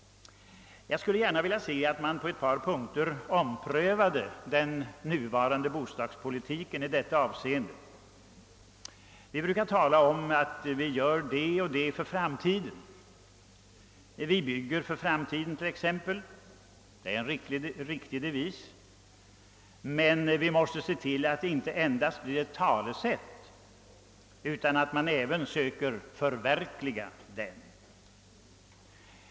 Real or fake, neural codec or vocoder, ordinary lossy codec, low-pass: real; none; none; none